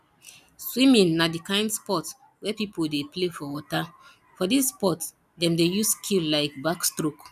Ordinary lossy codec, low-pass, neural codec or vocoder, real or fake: none; 14.4 kHz; none; real